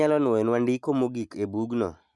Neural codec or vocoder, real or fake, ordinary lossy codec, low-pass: none; real; none; none